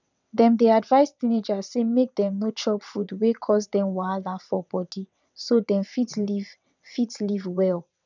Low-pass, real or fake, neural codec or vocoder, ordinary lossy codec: 7.2 kHz; fake; vocoder, 22.05 kHz, 80 mel bands, WaveNeXt; none